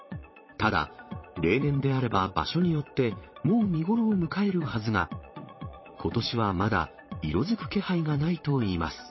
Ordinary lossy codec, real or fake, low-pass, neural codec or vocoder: MP3, 24 kbps; fake; 7.2 kHz; vocoder, 22.05 kHz, 80 mel bands, Vocos